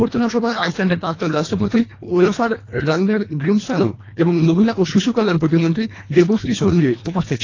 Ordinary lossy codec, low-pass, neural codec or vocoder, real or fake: AAC, 32 kbps; 7.2 kHz; codec, 24 kHz, 1.5 kbps, HILCodec; fake